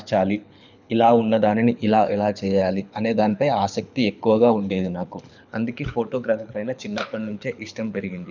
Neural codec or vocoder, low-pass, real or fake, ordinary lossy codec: codec, 24 kHz, 6 kbps, HILCodec; 7.2 kHz; fake; none